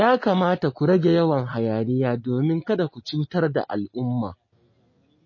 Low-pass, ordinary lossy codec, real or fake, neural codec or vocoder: 7.2 kHz; MP3, 32 kbps; real; none